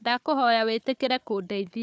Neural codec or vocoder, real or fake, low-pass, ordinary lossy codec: codec, 16 kHz, 4 kbps, FunCodec, trained on Chinese and English, 50 frames a second; fake; none; none